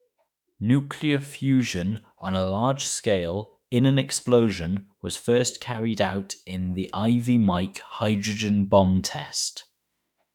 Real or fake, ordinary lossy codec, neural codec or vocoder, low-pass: fake; none; autoencoder, 48 kHz, 32 numbers a frame, DAC-VAE, trained on Japanese speech; 19.8 kHz